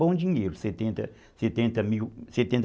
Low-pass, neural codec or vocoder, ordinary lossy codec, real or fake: none; none; none; real